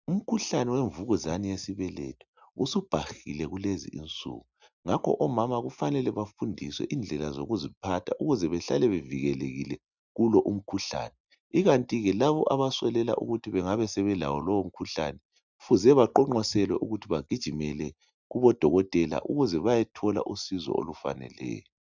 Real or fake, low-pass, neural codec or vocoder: real; 7.2 kHz; none